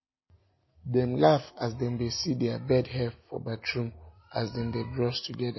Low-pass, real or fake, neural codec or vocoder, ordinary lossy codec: 7.2 kHz; real; none; MP3, 24 kbps